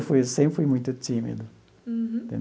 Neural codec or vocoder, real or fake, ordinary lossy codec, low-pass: none; real; none; none